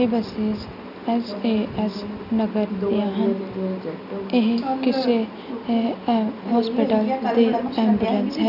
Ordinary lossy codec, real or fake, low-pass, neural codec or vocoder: none; real; 5.4 kHz; none